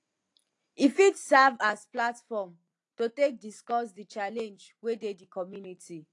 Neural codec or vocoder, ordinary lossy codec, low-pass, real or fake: none; AAC, 48 kbps; 10.8 kHz; real